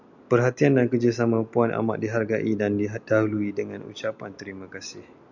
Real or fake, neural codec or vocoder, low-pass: real; none; 7.2 kHz